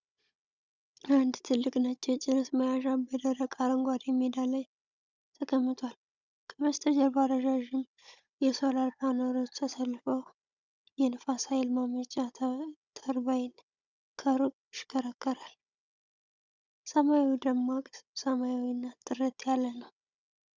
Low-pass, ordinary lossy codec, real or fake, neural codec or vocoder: 7.2 kHz; Opus, 64 kbps; fake; codec, 16 kHz, 16 kbps, FunCodec, trained on Chinese and English, 50 frames a second